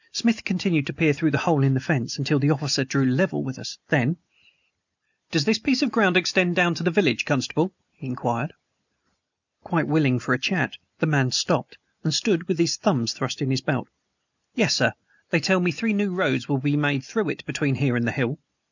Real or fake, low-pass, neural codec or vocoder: real; 7.2 kHz; none